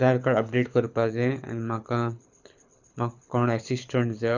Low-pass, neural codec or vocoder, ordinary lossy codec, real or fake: 7.2 kHz; codec, 24 kHz, 6 kbps, HILCodec; none; fake